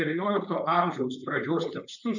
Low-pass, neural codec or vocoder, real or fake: 7.2 kHz; codec, 16 kHz, 4.8 kbps, FACodec; fake